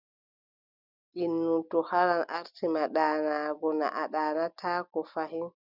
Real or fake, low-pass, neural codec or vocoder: real; 5.4 kHz; none